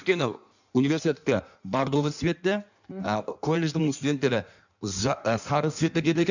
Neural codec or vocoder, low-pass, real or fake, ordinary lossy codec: codec, 16 kHz in and 24 kHz out, 1.1 kbps, FireRedTTS-2 codec; 7.2 kHz; fake; none